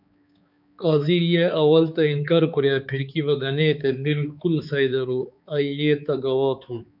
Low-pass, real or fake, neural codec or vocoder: 5.4 kHz; fake; codec, 16 kHz, 4 kbps, X-Codec, HuBERT features, trained on balanced general audio